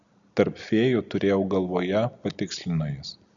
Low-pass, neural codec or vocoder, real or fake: 7.2 kHz; none; real